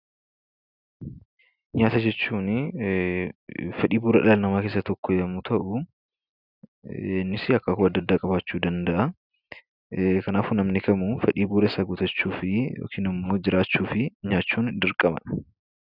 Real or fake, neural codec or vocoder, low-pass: real; none; 5.4 kHz